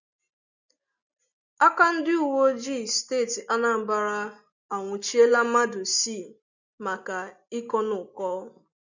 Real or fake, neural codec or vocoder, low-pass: real; none; 7.2 kHz